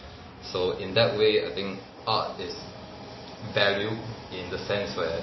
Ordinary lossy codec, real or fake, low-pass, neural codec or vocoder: MP3, 24 kbps; real; 7.2 kHz; none